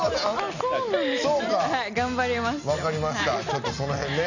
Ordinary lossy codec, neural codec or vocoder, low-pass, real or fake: none; none; 7.2 kHz; real